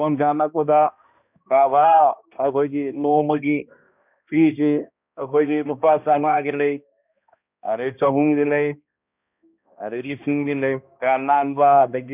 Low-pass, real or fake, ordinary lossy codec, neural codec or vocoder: 3.6 kHz; fake; none; codec, 16 kHz, 1 kbps, X-Codec, HuBERT features, trained on balanced general audio